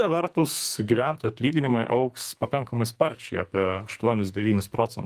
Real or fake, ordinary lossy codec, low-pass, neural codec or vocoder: fake; Opus, 32 kbps; 14.4 kHz; codec, 44.1 kHz, 2.6 kbps, SNAC